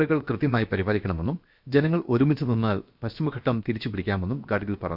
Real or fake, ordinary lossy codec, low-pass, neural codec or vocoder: fake; none; 5.4 kHz; codec, 16 kHz, about 1 kbps, DyCAST, with the encoder's durations